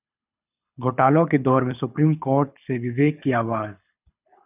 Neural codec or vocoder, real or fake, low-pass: codec, 24 kHz, 6 kbps, HILCodec; fake; 3.6 kHz